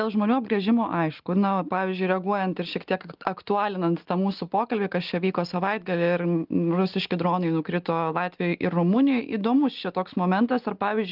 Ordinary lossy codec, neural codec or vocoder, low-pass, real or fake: Opus, 32 kbps; none; 5.4 kHz; real